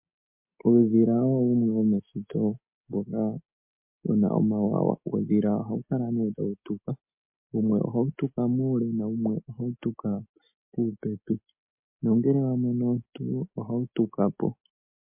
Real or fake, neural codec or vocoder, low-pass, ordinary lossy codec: real; none; 3.6 kHz; MP3, 32 kbps